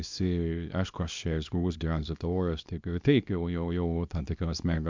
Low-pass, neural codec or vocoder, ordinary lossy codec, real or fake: 7.2 kHz; codec, 24 kHz, 0.9 kbps, WavTokenizer, small release; MP3, 64 kbps; fake